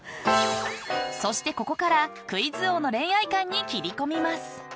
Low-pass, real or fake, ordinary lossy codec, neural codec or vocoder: none; real; none; none